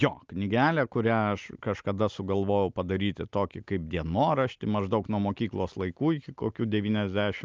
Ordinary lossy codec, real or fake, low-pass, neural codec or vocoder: Opus, 24 kbps; real; 7.2 kHz; none